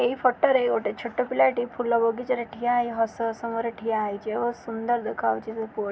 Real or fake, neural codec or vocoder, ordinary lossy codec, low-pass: real; none; none; none